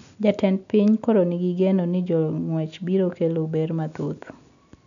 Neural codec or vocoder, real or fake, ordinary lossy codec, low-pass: none; real; none; 7.2 kHz